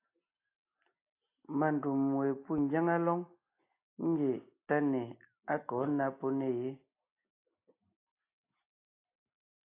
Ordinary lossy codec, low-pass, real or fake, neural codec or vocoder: AAC, 24 kbps; 3.6 kHz; real; none